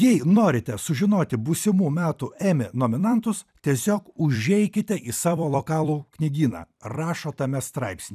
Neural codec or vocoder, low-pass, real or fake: vocoder, 44.1 kHz, 128 mel bands every 256 samples, BigVGAN v2; 14.4 kHz; fake